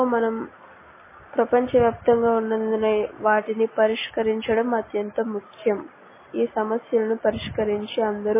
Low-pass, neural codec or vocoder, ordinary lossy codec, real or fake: 3.6 kHz; none; MP3, 16 kbps; real